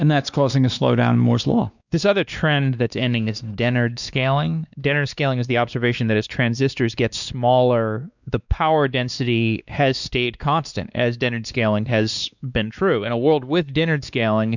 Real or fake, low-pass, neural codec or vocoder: fake; 7.2 kHz; codec, 16 kHz, 2 kbps, X-Codec, WavLM features, trained on Multilingual LibriSpeech